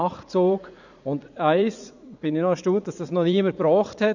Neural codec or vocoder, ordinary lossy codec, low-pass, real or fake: vocoder, 44.1 kHz, 80 mel bands, Vocos; none; 7.2 kHz; fake